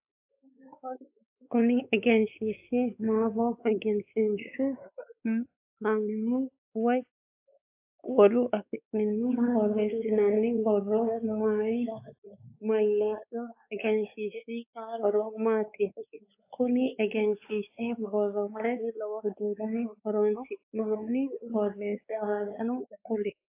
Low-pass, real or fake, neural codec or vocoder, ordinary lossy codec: 3.6 kHz; fake; codec, 16 kHz, 4 kbps, X-Codec, WavLM features, trained on Multilingual LibriSpeech; AAC, 32 kbps